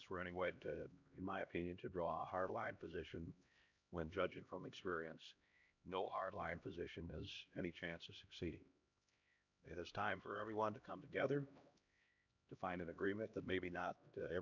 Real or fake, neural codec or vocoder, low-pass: fake; codec, 16 kHz, 1 kbps, X-Codec, HuBERT features, trained on LibriSpeech; 7.2 kHz